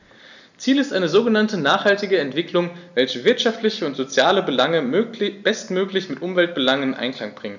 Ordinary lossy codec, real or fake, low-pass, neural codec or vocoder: none; real; 7.2 kHz; none